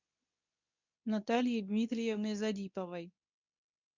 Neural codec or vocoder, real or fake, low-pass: codec, 24 kHz, 0.9 kbps, WavTokenizer, medium speech release version 2; fake; 7.2 kHz